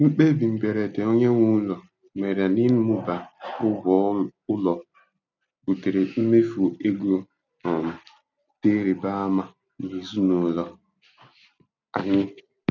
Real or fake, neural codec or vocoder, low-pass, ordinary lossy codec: real; none; 7.2 kHz; none